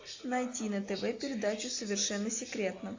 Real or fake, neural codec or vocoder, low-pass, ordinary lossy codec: real; none; 7.2 kHz; AAC, 48 kbps